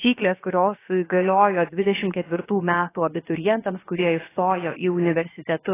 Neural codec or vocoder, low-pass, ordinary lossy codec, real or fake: codec, 16 kHz, about 1 kbps, DyCAST, with the encoder's durations; 3.6 kHz; AAC, 16 kbps; fake